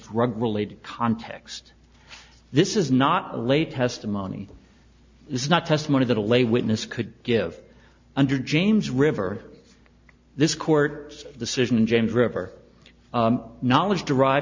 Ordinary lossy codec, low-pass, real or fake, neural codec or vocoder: MP3, 64 kbps; 7.2 kHz; real; none